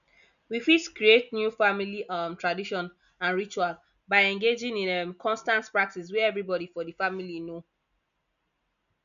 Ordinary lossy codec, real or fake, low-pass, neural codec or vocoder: none; real; 7.2 kHz; none